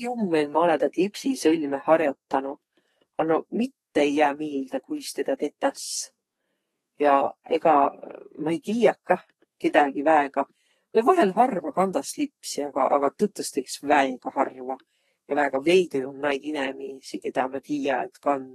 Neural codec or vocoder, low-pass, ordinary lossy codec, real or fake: codec, 32 kHz, 1.9 kbps, SNAC; 14.4 kHz; AAC, 32 kbps; fake